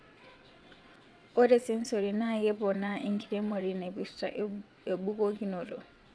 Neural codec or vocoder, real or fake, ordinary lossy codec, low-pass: vocoder, 22.05 kHz, 80 mel bands, WaveNeXt; fake; none; none